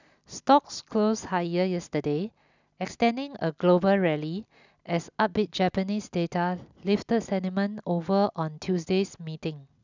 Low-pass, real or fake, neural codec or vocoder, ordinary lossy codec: 7.2 kHz; real; none; none